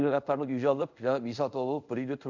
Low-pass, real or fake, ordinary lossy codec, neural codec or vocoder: 7.2 kHz; fake; none; codec, 24 kHz, 0.5 kbps, DualCodec